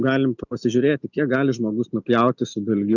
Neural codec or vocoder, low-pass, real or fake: none; 7.2 kHz; real